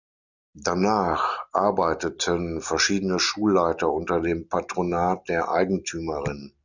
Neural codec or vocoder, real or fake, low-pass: none; real; 7.2 kHz